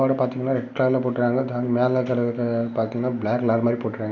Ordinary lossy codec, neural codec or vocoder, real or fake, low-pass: none; none; real; none